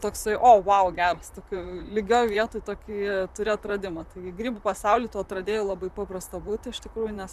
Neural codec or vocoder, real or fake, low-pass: vocoder, 44.1 kHz, 128 mel bands, Pupu-Vocoder; fake; 14.4 kHz